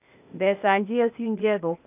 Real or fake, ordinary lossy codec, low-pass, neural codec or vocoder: fake; none; 3.6 kHz; codec, 16 kHz, 0.8 kbps, ZipCodec